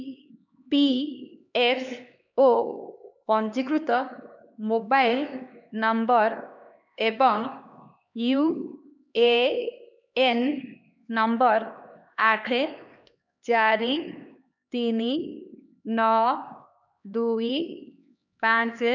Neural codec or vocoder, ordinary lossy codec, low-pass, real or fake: codec, 16 kHz, 2 kbps, X-Codec, HuBERT features, trained on LibriSpeech; none; 7.2 kHz; fake